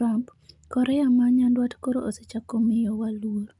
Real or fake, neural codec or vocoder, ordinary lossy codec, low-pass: real; none; none; 10.8 kHz